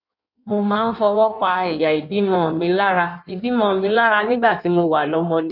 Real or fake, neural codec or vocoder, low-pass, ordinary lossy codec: fake; codec, 16 kHz in and 24 kHz out, 1.1 kbps, FireRedTTS-2 codec; 5.4 kHz; none